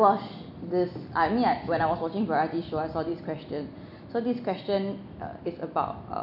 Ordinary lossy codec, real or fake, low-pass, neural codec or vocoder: none; real; 5.4 kHz; none